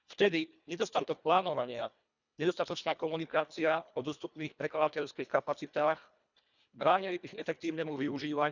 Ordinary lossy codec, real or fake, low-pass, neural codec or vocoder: none; fake; 7.2 kHz; codec, 24 kHz, 1.5 kbps, HILCodec